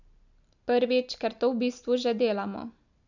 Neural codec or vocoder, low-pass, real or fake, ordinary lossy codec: none; 7.2 kHz; real; none